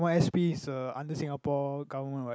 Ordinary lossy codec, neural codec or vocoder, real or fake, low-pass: none; none; real; none